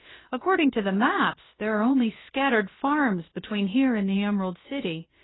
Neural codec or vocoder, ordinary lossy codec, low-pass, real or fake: codec, 16 kHz, about 1 kbps, DyCAST, with the encoder's durations; AAC, 16 kbps; 7.2 kHz; fake